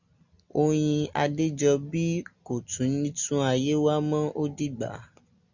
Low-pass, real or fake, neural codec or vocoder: 7.2 kHz; real; none